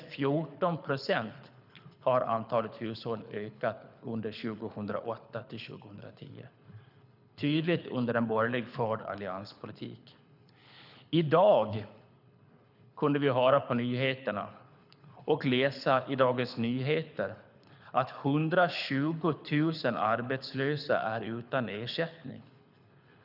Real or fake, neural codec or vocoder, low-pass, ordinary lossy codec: fake; codec, 24 kHz, 6 kbps, HILCodec; 5.4 kHz; none